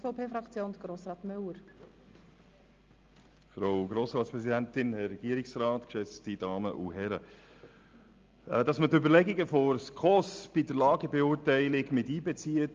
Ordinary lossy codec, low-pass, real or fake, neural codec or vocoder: Opus, 32 kbps; 7.2 kHz; real; none